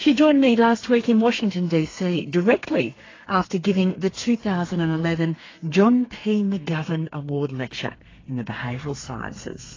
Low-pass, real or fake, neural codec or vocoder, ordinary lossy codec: 7.2 kHz; fake; codec, 32 kHz, 1.9 kbps, SNAC; AAC, 32 kbps